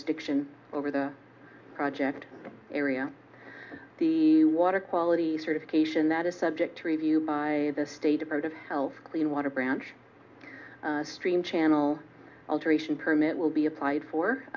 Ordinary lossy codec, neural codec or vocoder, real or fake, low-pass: MP3, 64 kbps; none; real; 7.2 kHz